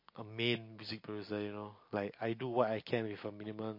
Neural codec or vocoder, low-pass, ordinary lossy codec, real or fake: none; 5.4 kHz; MP3, 32 kbps; real